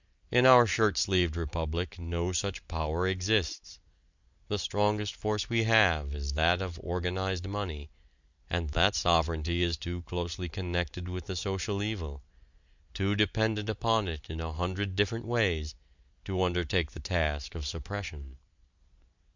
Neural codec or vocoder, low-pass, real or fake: none; 7.2 kHz; real